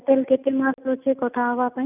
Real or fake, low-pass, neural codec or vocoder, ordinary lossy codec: real; 3.6 kHz; none; none